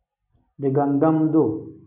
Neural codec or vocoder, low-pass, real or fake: none; 3.6 kHz; real